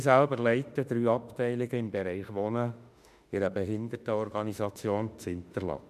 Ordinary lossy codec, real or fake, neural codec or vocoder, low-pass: MP3, 96 kbps; fake; autoencoder, 48 kHz, 32 numbers a frame, DAC-VAE, trained on Japanese speech; 14.4 kHz